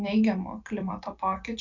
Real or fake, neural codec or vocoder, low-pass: fake; vocoder, 44.1 kHz, 128 mel bands every 256 samples, BigVGAN v2; 7.2 kHz